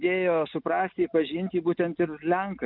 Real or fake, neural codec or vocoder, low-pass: real; none; 5.4 kHz